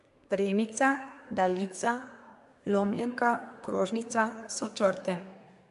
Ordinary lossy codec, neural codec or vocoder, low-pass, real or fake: none; codec, 24 kHz, 1 kbps, SNAC; 10.8 kHz; fake